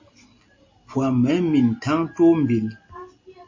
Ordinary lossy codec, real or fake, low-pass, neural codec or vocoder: MP3, 32 kbps; real; 7.2 kHz; none